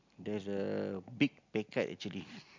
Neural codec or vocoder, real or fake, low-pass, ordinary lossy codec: none; real; 7.2 kHz; MP3, 64 kbps